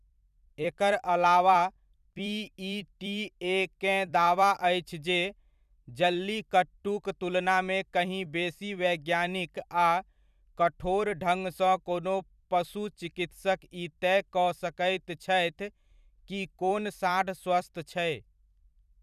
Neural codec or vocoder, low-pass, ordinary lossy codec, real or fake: vocoder, 44.1 kHz, 128 mel bands every 256 samples, BigVGAN v2; 14.4 kHz; none; fake